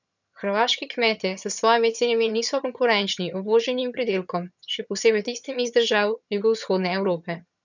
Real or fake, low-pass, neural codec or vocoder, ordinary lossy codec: fake; 7.2 kHz; vocoder, 22.05 kHz, 80 mel bands, HiFi-GAN; none